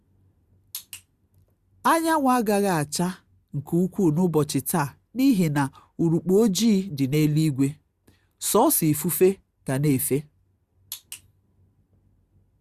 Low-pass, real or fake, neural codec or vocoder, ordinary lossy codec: 14.4 kHz; real; none; Opus, 64 kbps